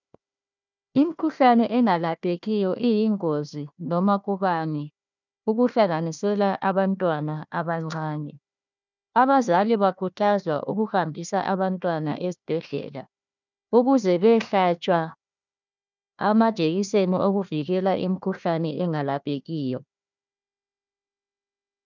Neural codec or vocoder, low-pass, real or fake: codec, 16 kHz, 1 kbps, FunCodec, trained on Chinese and English, 50 frames a second; 7.2 kHz; fake